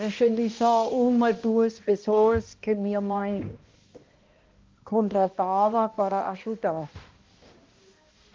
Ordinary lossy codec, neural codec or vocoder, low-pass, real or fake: Opus, 32 kbps; codec, 16 kHz, 1 kbps, X-Codec, HuBERT features, trained on balanced general audio; 7.2 kHz; fake